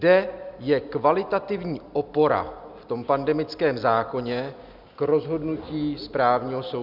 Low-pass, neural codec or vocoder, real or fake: 5.4 kHz; none; real